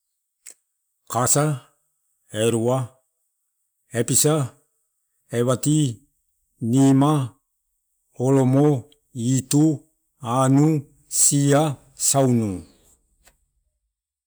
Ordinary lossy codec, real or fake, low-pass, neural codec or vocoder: none; real; none; none